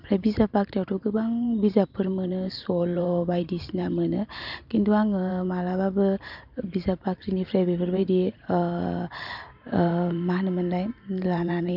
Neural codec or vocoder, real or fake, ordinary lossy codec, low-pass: vocoder, 44.1 kHz, 128 mel bands every 512 samples, BigVGAN v2; fake; none; 5.4 kHz